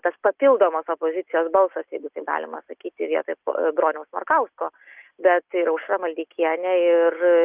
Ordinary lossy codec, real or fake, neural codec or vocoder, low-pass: Opus, 32 kbps; real; none; 3.6 kHz